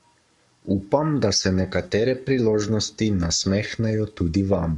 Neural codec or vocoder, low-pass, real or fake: codec, 44.1 kHz, 7.8 kbps, Pupu-Codec; 10.8 kHz; fake